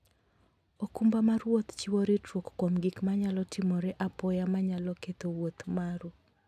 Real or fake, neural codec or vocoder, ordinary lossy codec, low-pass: real; none; AAC, 96 kbps; 14.4 kHz